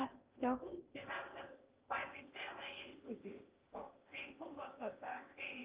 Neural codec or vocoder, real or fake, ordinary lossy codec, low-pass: codec, 16 kHz in and 24 kHz out, 0.8 kbps, FocalCodec, streaming, 65536 codes; fake; Opus, 24 kbps; 3.6 kHz